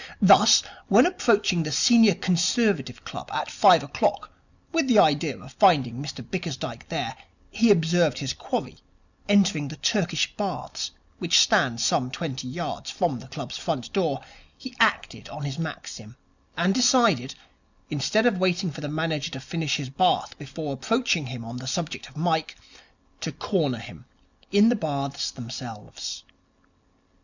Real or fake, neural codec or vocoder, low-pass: real; none; 7.2 kHz